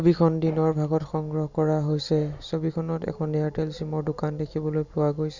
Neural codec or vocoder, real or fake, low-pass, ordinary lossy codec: none; real; 7.2 kHz; Opus, 64 kbps